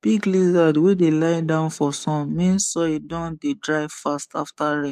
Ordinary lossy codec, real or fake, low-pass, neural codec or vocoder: none; fake; 14.4 kHz; codec, 44.1 kHz, 7.8 kbps, DAC